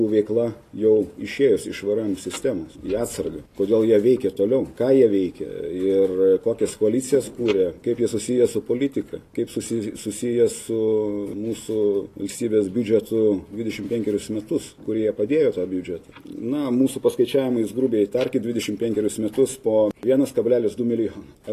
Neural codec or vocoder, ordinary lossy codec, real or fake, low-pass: none; AAC, 48 kbps; real; 14.4 kHz